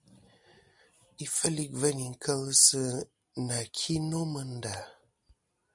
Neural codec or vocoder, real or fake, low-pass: none; real; 10.8 kHz